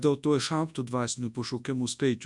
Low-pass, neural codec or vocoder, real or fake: 10.8 kHz; codec, 24 kHz, 0.9 kbps, WavTokenizer, large speech release; fake